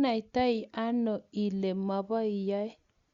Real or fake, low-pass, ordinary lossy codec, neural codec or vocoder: real; 7.2 kHz; Opus, 64 kbps; none